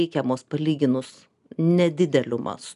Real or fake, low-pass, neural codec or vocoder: real; 10.8 kHz; none